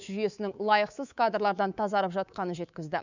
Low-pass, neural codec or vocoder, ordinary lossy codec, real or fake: 7.2 kHz; codec, 24 kHz, 3.1 kbps, DualCodec; none; fake